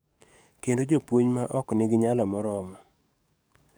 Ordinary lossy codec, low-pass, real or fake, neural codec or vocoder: none; none; fake; codec, 44.1 kHz, 7.8 kbps, DAC